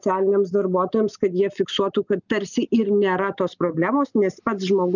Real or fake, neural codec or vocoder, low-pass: real; none; 7.2 kHz